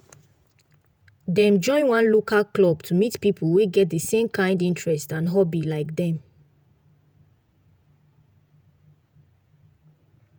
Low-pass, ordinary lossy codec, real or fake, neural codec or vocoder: none; none; fake; vocoder, 48 kHz, 128 mel bands, Vocos